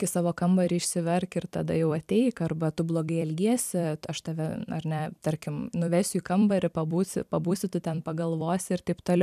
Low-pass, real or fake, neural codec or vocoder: 14.4 kHz; fake; vocoder, 44.1 kHz, 128 mel bands every 256 samples, BigVGAN v2